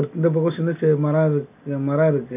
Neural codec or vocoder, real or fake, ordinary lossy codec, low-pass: none; real; none; 3.6 kHz